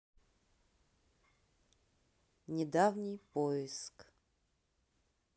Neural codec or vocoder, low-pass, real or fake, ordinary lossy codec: none; none; real; none